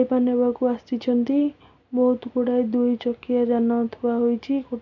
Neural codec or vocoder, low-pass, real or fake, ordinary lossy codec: none; 7.2 kHz; real; MP3, 64 kbps